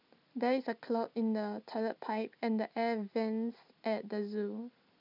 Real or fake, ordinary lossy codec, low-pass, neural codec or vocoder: real; none; 5.4 kHz; none